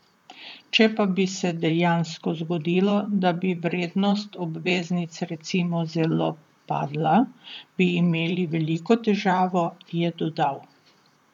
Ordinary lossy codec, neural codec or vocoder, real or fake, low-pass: none; vocoder, 44.1 kHz, 128 mel bands, Pupu-Vocoder; fake; 19.8 kHz